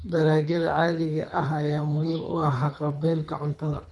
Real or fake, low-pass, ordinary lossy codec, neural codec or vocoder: fake; none; none; codec, 24 kHz, 3 kbps, HILCodec